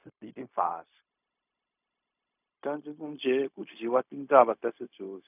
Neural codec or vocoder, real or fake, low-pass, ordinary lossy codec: codec, 16 kHz, 0.4 kbps, LongCat-Audio-Codec; fake; 3.6 kHz; none